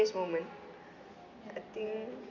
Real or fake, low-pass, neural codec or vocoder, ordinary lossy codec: real; 7.2 kHz; none; none